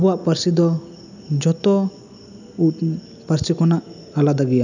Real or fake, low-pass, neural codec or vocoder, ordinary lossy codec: real; 7.2 kHz; none; none